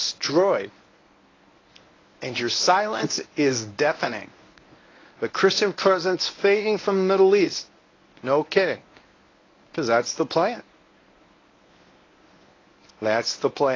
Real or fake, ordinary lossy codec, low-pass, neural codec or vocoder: fake; AAC, 32 kbps; 7.2 kHz; codec, 24 kHz, 0.9 kbps, WavTokenizer, medium speech release version 1